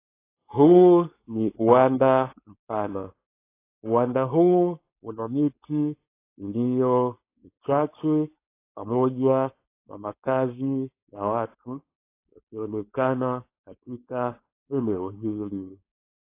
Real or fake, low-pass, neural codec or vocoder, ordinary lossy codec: fake; 3.6 kHz; codec, 16 kHz, 4.8 kbps, FACodec; AAC, 24 kbps